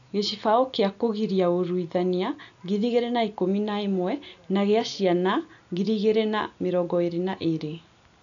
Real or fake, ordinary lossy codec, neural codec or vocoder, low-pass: real; none; none; 7.2 kHz